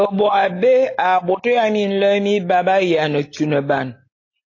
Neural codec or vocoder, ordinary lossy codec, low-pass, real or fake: none; AAC, 32 kbps; 7.2 kHz; real